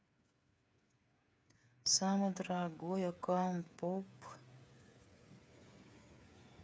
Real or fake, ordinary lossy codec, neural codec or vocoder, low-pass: fake; none; codec, 16 kHz, 8 kbps, FreqCodec, smaller model; none